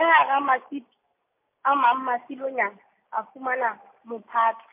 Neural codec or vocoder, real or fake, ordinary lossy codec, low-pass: none; real; MP3, 24 kbps; 3.6 kHz